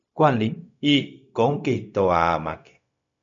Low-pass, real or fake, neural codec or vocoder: 7.2 kHz; fake; codec, 16 kHz, 0.4 kbps, LongCat-Audio-Codec